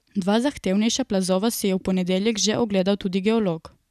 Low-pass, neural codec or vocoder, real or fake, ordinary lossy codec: 14.4 kHz; none; real; none